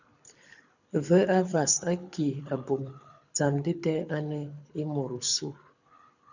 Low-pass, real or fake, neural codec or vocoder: 7.2 kHz; fake; codec, 24 kHz, 6 kbps, HILCodec